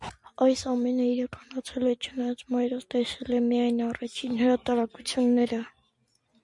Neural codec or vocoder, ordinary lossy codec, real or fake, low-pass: none; MP3, 64 kbps; real; 10.8 kHz